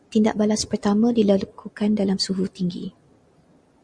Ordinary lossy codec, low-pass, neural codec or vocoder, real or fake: Opus, 64 kbps; 9.9 kHz; none; real